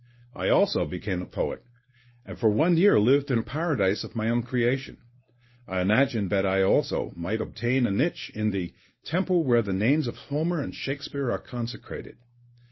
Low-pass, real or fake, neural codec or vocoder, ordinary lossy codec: 7.2 kHz; fake; codec, 24 kHz, 0.9 kbps, WavTokenizer, medium speech release version 1; MP3, 24 kbps